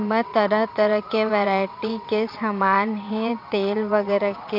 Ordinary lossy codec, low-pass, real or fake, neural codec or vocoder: AAC, 48 kbps; 5.4 kHz; fake; vocoder, 22.05 kHz, 80 mel bands, WaveNeXt